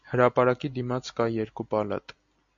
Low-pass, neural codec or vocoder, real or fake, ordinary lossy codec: 7.2 kHz; none; real; MP3, 64 kbps